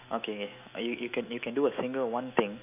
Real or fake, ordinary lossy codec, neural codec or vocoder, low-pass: fake; none; autoencoder, 48 kHz, 128 numbers a frame, DAC-VAE, trained on Japanese speech; 3.6 kHz